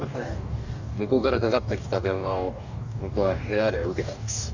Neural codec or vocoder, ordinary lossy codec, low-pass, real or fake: codec, 44.1 kHz, 2.6 kbps, DAC; none; 7.2 kHz; fake